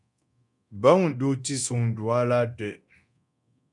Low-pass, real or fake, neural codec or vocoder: 10.8 kHz; fake; codec, 24 kHz, 0.9 kbps, DualCodec